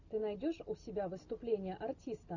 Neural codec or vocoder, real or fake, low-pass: none; real; 7.2 kHz